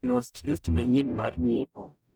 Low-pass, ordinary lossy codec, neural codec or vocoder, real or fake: none; none; codec, 44.1 kHz, 0.9 kbps, DAC; fake